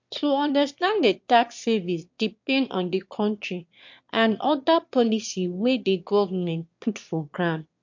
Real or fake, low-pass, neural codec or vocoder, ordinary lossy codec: fake; 7.2 kHz; autoencoder, 22.05 kHz, a latent of 192 numbers a frame, VITS, trained on one speaker; MP3, 48 kbps